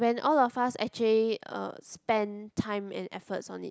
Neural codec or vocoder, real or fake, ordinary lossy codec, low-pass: none; real; none; none